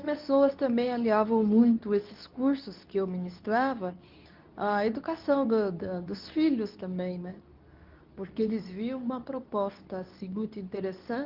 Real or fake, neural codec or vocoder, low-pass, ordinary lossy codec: fake; codec, 24 kHz, 0.9 kbps, WavTokenizer, medium speech release version 2; 5.4 kHz; Opus, 32 kbps